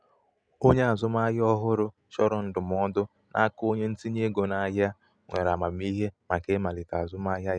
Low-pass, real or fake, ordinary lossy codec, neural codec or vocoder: 9.9 kHz; real; none; none